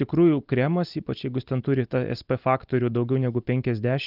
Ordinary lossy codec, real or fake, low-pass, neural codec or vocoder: Opus, 32 kbps; real; 5.4 kHz; none